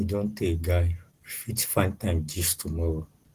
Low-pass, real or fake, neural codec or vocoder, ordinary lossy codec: 14.4 kHz; real; none; Opus, 16 kbps